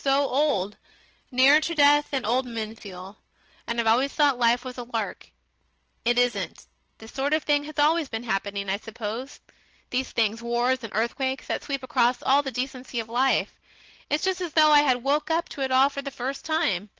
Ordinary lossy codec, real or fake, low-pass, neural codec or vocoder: Opus, 16 kbps; real; 7.2 kHz; none